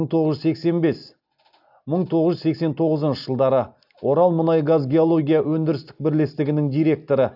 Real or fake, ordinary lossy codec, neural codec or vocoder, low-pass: real; AAC, 48 kbps; none; 5.4 kHz